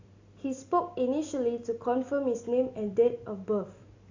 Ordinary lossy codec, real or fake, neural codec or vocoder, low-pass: AAC, 48 kbps; real; none; 7.2 kHz